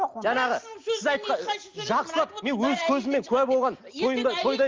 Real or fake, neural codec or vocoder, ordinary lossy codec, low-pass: real; none; Opus, 32 kbps; 7.2 kHz